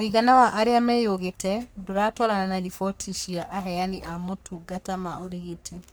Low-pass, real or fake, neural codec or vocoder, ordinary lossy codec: none; fake; codec, 44.1 kHz, 3.4 kbps, Pupu-Codec; none